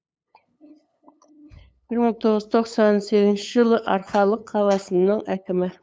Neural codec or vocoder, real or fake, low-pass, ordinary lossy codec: codec, 16 kHz, 8 kbps, FunCodec, trained on LibriTTS, 25 frames a second; fake; none; none